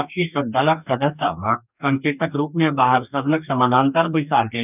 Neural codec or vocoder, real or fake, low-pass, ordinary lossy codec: codec, 44.1 kHz, 2.6 kbps, DAC; fake; 3.6 kHz; none